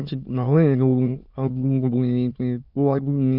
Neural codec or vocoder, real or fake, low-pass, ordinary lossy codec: autoencoder, 22.05 kHz, a latent of 192 numbers a frame, VITS, trained on many speakers; fake; 5.4 kHz; none